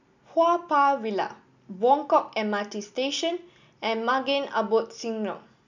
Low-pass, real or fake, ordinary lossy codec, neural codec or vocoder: 7.2 kHz; real; none; none